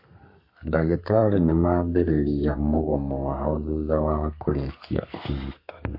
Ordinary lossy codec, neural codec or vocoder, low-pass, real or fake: MP3, 32 kbps; codec, 32 kHz, 1.9 kbps, SNAC; 5.4 kHz; fake